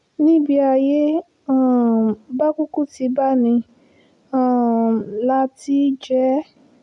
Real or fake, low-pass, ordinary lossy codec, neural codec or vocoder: real; 10.8 kHz; none; none